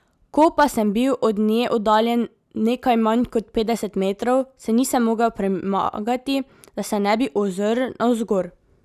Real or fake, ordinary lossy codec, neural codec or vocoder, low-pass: real; none; none; 14.4 kHz